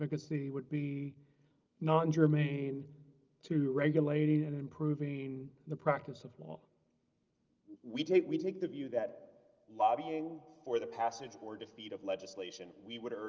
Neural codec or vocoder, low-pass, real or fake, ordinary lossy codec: none; 7.2 kHz; real; Opus, 24 kbps